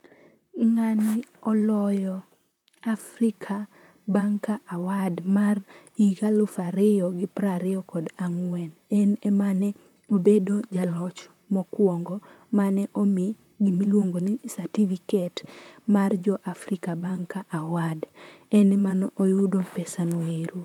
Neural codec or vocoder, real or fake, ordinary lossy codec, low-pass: vocoder, 44.1 kHz, 128 mel bands, Pupu-Vocoder; fake; none; 19.8 kHz